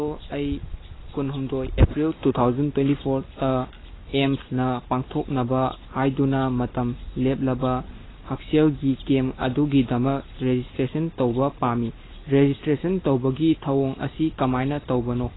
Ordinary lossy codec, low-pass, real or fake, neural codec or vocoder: AAC, 16 kbps; 7.2 kHz; real; none